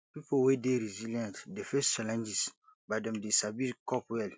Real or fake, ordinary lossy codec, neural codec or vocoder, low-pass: real; none; none; none